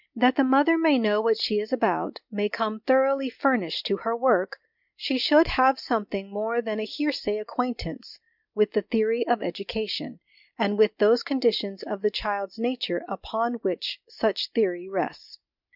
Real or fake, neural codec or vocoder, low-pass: real; none; 5.4 kHz